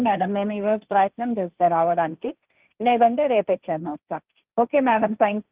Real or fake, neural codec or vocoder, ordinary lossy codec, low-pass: fake; codec, 16 kHz, 1.1 kbps, Voila-Tokenizer; Opus, 32 kbps; 3.6 kHz